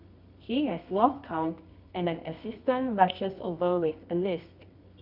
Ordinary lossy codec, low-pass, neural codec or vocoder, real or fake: none; 5.4 kHz; codec, 24 kHz, 0.9 kbps, WavTokenizer, medium music audio release; fake